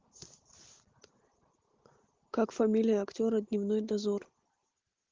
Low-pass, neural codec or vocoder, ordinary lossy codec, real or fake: 7.2 kHz; none; Opus, 16 kbps; real